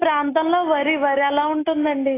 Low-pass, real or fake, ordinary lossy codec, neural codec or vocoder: 3.6 kHz; real; AAC, 16 kbps; none